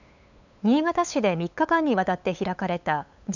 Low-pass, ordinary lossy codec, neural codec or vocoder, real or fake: 7.2 kHz; none; codec, 16 kHz, 8 kbps, FunCodec, trained on LibriTTS, 25 frames a second; fake